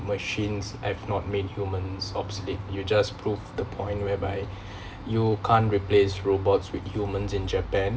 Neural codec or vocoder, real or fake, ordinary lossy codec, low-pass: none; real; none; none